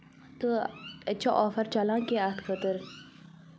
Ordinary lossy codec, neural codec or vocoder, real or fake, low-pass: none; none; real; none